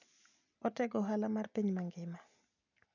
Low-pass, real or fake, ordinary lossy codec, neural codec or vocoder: 7.2 kHz; real; none; none